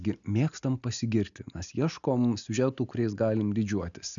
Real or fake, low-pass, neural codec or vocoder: real; 7.2 kHz; none